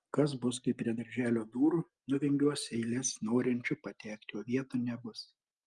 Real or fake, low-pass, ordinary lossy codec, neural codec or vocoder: real; 10.8 kHz; Opus, 32 kbps; none